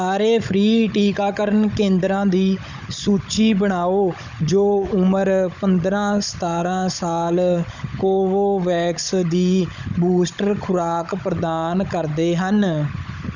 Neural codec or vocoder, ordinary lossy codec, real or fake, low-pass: codec, 16 kHz, 16 kbps, FunCodec, trained on Chinese and English, 50 frames a second; none; fake; 7.2 kHz